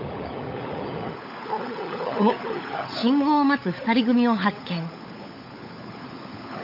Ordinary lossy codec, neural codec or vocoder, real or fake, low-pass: none; codec, 16 kHz, 16 kbps, FunCodec, trained on LibriTTS, 50 frames a second; fake; 5.4 kHz